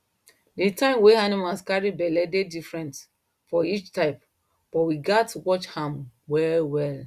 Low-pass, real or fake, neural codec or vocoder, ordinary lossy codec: 14.4 kHz; real; none; Opus, 64 kbps